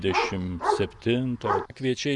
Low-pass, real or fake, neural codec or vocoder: 10.8 kHz; fake; vocoder, 24 kHz, 100 mel bands, Vocos